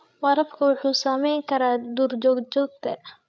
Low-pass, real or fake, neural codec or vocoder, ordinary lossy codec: none; fake; codec, 16 kHz, 8 kbps, FreqCodec, larger model; none